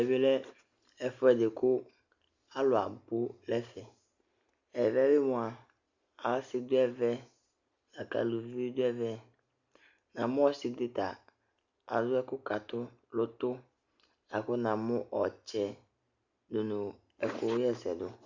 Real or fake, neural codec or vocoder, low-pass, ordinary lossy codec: real; none; 7.2 kHz; Opus, 64 kbps